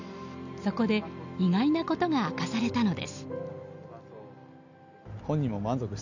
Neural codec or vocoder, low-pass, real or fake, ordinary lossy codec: none; 7.2 kHz; real; none